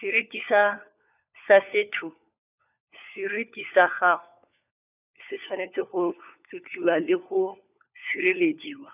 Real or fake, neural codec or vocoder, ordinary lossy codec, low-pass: fake; codec, 16 kHz, 4 kbps, FunCodec, trained on LibriTTS, 50 frames a second; none; 3.6 kHz